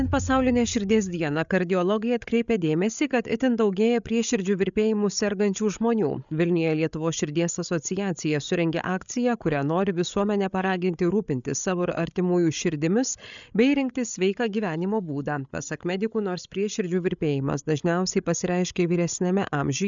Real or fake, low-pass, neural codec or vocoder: fake; 7.2 kHz; codec, 16 kHz, 8 kbps, FreqCodec, larger model